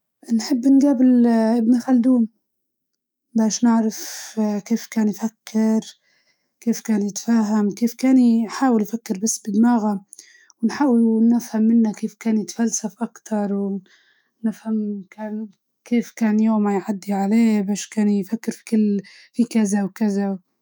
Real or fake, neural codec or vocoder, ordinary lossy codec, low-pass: fake; autoencoder, 48 kHz, 128 numbers a frame, DAC-VAE, trained on Japanese speech; none; none